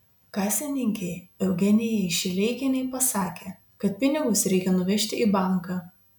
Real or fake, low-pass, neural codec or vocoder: real; 19.8 kHz; none